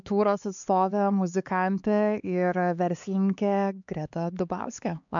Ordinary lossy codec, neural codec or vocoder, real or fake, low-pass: MP3, 64 kbps; codec, 16 kHz, 4 kbps, X-Codec, HuBERT features, trained on LibriSpeech; fake; 7.2 kHz